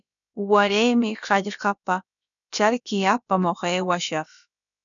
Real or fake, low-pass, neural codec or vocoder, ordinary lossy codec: fake; 7.2 kHz; codec, 16 kHz, about 1 kbps, DyCAST, with the encoder's durations; MP3, 96 kbps